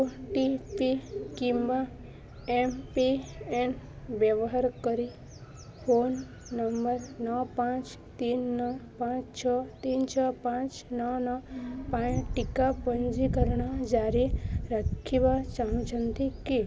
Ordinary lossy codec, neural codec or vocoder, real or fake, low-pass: none; none; real; none